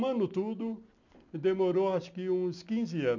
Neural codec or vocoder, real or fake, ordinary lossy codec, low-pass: none; real; none; 7.2 kHz